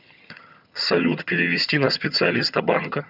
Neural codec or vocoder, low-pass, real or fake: vocoder, 22.05 kHz, 80 mel bands, HiFi-GAN; 5.4 kHz; fake